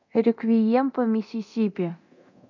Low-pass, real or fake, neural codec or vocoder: 7.2 kHz; fake; codec, 24 kHz, 0.9 kbps, DualCodec